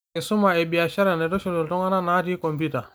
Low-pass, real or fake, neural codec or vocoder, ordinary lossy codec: none; real; none; none